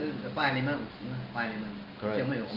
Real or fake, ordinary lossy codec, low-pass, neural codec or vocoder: real; Opus, 32 kbps; 5.4 kHz; none